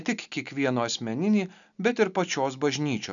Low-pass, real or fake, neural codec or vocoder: 7.2 kHz; real; none